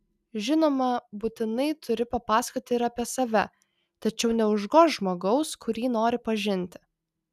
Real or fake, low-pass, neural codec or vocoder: real; 14.4 kHz; none